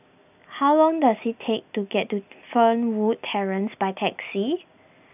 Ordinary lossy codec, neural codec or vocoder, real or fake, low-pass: none; none; real; 3.6 kHz